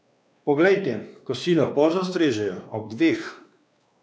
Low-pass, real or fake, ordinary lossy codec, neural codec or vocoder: none; fake; none; codec, 16 kHz, 2 kbps, X-Codec, WavLM features, trained on Multilingual LibriSpeech